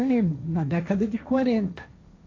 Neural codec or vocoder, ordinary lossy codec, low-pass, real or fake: codec, 16 kHz, 1.1 kbps, Voila-Tokenizer; AAC, 32 kbps; 7.2 kHz; fake